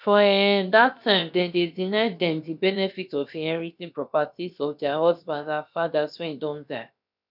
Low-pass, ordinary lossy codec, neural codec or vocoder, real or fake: 5.4 kHz; none; codec, 16 kHz, about 1 kbps, DyCAST, with the encoder's durations; fake